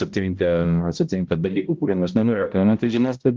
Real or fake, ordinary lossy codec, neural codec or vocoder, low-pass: fake; Opus, 32 kbps; codec, 16 kHz, 0.5 kbps, X-Codec, HuBERT features, trained on balanced general audio; 7.2 kHz